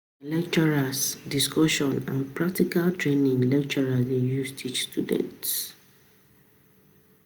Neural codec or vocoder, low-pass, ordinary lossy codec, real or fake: none; none; none; real